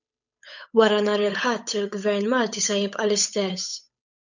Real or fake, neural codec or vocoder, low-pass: fake; codec, 16 kHz, 8 kbps, FunCodec, trained on Chinese and English, 25 frames a second; 7.2 kHz